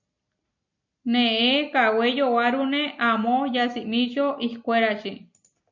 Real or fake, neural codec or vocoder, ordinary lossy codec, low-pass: real; none; MP3, 64 kbps; 7.2 kHz